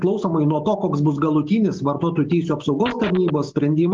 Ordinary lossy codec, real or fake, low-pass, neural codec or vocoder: Opus, 32 kbps; real; 7.2 kHz; none